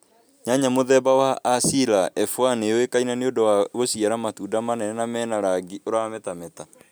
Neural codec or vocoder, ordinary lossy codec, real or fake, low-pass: none; none; real; none